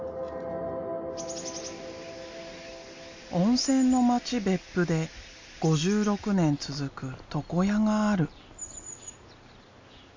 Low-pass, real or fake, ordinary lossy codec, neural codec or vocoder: 7.2 kHz; real; MP3, 48 kbps; none